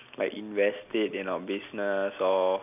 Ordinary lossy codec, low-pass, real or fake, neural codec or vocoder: none; 3.6 kHz; real; none